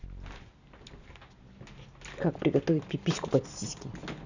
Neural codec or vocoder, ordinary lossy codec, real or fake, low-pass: none; none; real; 7.2 kHz